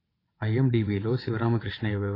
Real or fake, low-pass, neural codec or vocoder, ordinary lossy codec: fake; 5.4 kHz; vocoder, 22.05 kHz, 80 mel bands, WaveNeXt; AAC, 24 kbps